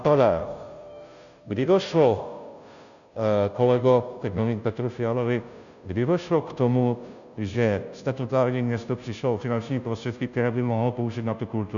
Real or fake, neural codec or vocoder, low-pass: fake; codec, 16 kHz, 0.5 kbps, FunCodec, trained on Chinese and English, 25 frames a second; 7.2 kHz